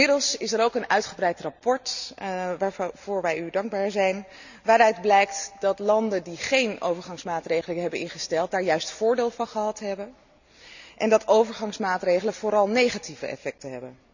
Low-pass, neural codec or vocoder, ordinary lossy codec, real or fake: 7.2 kHz; none; none; real